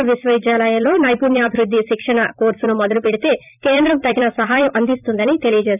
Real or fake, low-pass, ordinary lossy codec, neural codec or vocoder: fake; 3.6 kHz; none; vocoder, 44.1 kHz, 128 mel bands every 256 samples, BigVGAN v2